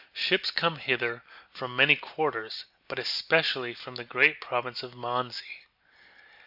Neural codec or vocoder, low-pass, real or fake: none; 5.4 kHz; real